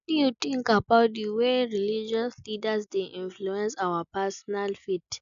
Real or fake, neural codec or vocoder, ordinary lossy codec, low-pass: real; none; none; 7.2 kHz